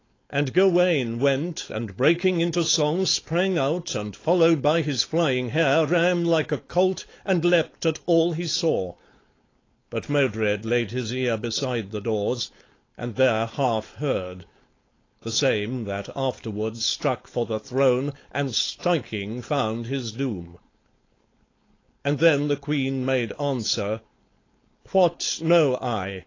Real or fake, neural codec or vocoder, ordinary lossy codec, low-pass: fake; codec, 16 kHz, 4.8 kbps, FACodec; AAC, 32 kbps; 7.2 kHz